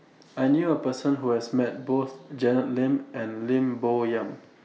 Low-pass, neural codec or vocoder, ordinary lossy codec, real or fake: none; none; none; real